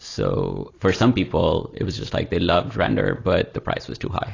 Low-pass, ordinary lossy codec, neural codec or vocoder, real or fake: 7.2 kHz; AAC, 32 kbps; none; real